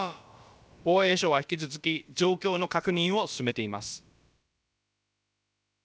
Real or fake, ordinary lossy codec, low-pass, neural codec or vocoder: fake; none; none; codec, 16 kHz, about 1 kbps, DyCAST, with the encoder's durations